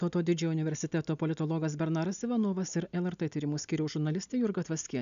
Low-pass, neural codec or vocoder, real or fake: 7.2 kHz; none; real